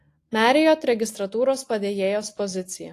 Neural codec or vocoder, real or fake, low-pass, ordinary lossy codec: none; real; 14.4 kHz; AAC, 48 kbps